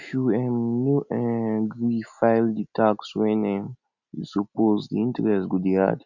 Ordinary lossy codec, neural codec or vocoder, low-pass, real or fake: none; none; 7.2 kHz; real